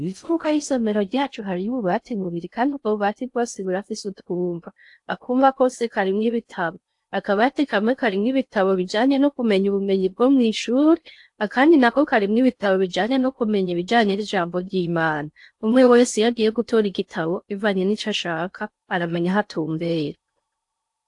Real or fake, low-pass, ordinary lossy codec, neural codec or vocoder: fake; 10.8 kHz; AAC, 64 kbps; codec, 16 kHz in and 24 kHz out, 0.6 kbps, FocalCodec, streaming, 4096 codes